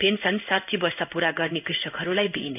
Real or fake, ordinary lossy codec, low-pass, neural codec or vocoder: fake; none; 3.6 kHz; codec, 16 kHz in and 24 kHz out, 1 kbps, XY-Tokenizer